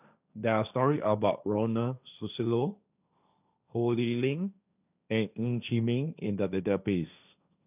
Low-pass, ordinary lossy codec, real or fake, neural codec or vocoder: 3.6 kHz; none; fake; codec, 16 kHz, 1.1 kbps, Voila-Tokenizer